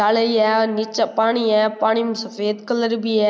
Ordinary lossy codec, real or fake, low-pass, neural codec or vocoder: none; real; none; none